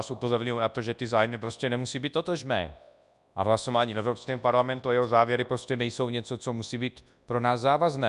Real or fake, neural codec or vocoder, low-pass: fake; codec, 24 kHz, 0.9 kbps, WavTokenizer, large speech release; 10.8 kHz